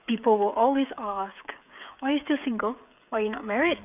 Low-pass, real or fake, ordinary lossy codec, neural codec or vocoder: 3.6 kHz; fake; none; codec, 16 kHz, 8 kbps, FreqCodec, smaller model